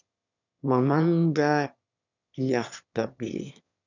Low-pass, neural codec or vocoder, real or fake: 7.2 kHz; autoencoder, 22.05 kHz, a latent of 192 numbers a frame, VITS, trained on one speaker; fake